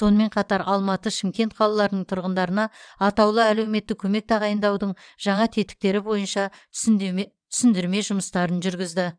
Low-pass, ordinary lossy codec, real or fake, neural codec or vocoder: 9.9 kHz; none; fake; vocoder, 22.05 kHz, 80 mel bands, WaveNeXt